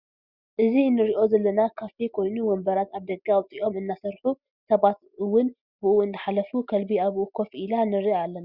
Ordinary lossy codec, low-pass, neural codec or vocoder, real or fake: Opus, 64 kbps; 5.4 kHz; none; real